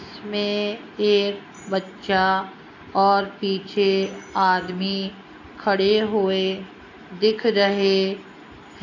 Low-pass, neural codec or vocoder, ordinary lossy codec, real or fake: 7.2 kHz; none; AAC, 48 kbps; real